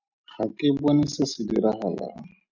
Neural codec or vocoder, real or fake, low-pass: none; real; 7.2 kHz